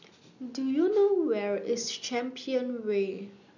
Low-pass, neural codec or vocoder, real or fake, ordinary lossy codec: 7.2 kHz; none; real; none